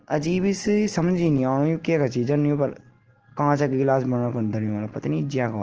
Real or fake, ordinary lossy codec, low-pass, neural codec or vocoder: real; Opus, 16 kbps; 7.2 kHz; none